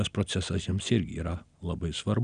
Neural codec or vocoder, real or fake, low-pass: none; real; 9.9 kHz